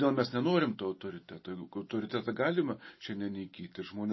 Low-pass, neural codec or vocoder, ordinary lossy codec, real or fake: 7.2 kHz; none; MP3, 24 kbps; real